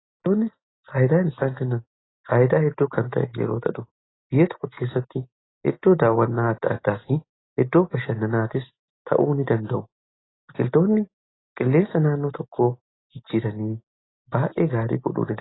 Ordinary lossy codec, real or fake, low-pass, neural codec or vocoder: AAC, 16 kbps; real; 7.2 kHz; none